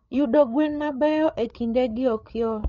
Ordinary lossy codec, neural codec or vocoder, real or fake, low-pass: MP3, 48 kbps; codec, 16 kHz, 8 kbps, FreqCodec, larger model; fake; 7.2 kHz